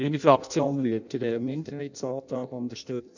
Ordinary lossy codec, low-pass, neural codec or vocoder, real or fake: none; 7.2 kHz; codec, 16 kHz in and 24 kHz out, 0.6 kbps, FireRedTTS-2 codec; fake